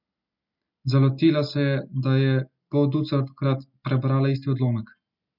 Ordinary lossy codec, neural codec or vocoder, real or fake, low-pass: none; none; real; 5.4 kHz